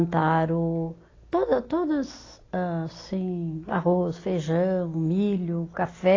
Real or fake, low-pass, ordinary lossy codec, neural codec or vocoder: real; 7.2 kHz; AAC, 32 kbps; none